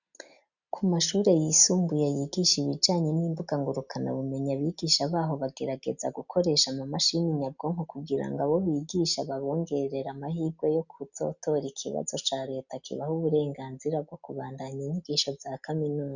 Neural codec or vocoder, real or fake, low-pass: none; real; 7.2 kHz